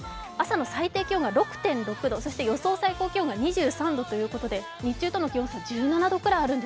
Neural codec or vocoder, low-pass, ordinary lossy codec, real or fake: none; none; none; real